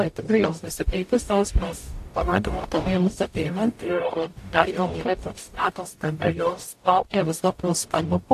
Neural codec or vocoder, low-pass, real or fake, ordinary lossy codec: codec, 44.1 kHz, 0.9 kbps, DAC; 14.4 kHz; fake; AAC, 64 kbps